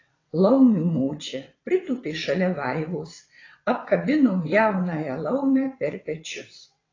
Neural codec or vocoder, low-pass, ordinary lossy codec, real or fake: vocoder, 22.05 kHz, 80 mel bands, WaveNeXt; 7.2 kHz; AAC, 32 kbps; fake